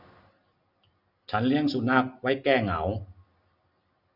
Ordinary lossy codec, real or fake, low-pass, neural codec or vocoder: none; real; 5.4 kHz; none